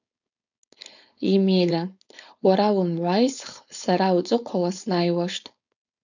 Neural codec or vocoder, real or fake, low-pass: codec, 16 kHz, 4.8 kbps, FACodec; fake; 7.2 kHz